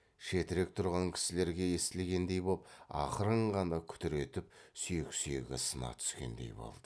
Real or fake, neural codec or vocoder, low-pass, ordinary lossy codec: real; none; none; none